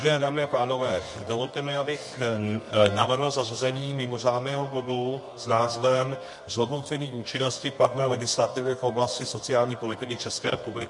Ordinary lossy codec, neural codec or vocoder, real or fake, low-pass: MP3, 48 kbps; codec, 24 kHz, 0.9 kbps, WavTokenizer, medium music audio release; fake; 10.8 kHz